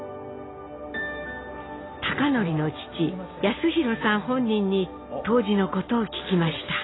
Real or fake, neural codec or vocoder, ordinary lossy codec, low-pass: real; none; AAC, 16 kbps; 7.2 kHz